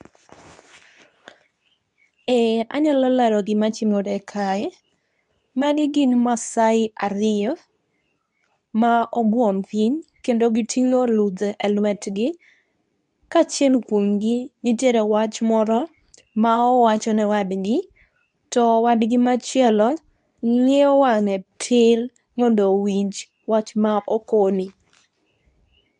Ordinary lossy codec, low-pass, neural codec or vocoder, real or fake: none; 10.8 kHz; codec, 24 kHz, 0.9 kbps, WavTokenizer, medium speech release version 2; fake